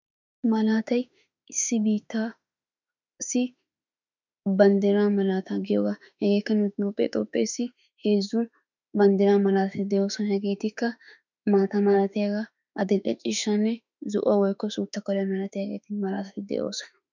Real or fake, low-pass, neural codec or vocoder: fake; 7.2 kHz; autoencoder, 48 kHz, 32 numbers a frame, DAC-VAE, trained on Japanese speech